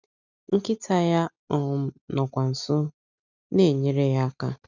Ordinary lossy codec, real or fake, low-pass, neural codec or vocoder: none; real; 7.2 kHz; none